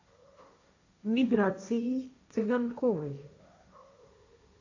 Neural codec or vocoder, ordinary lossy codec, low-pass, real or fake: codec, 16 kHz, 1.1 kbps, Voila-Tokenizer; AAC, 48 kbps; 7.2 kHz; fake